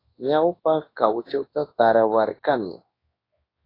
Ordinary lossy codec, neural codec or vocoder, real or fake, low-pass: AAC, 24 kbps; codec, 24 kHz, 0.9 kbps, WavTokenizer, large speech release; fake; 5.4 kHz